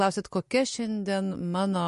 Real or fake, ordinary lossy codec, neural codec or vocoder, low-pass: real; MP3, 48 kbps; none; 14.4 kHz